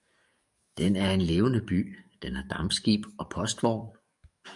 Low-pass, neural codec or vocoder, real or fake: 10.8 kHz; codec, 44.1 kHz, 7.8 kbps, DAC; fake